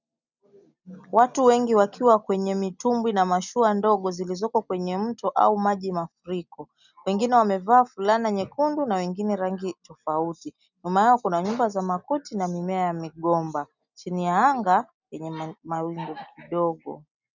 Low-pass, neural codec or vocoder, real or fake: 7.2 kHz; none; real